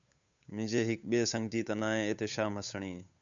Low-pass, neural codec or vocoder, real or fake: 7.2 kHz; codec, 16 kHz, 8 kbps, FunCodec, trained on Chinese and English, 25 frames a second; fake